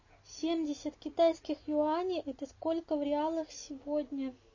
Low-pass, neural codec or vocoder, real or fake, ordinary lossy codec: 7.2 kHz; none; real; MP3, 32 kbps